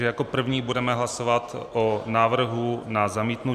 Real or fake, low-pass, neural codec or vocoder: real; 14.4 kHz; none